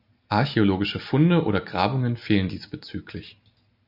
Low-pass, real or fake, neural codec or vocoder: 5.4 kHz; real; none